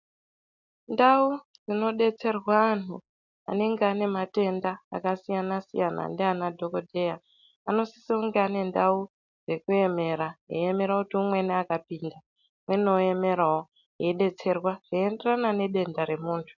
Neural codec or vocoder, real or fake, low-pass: none; real; 7.2 kHz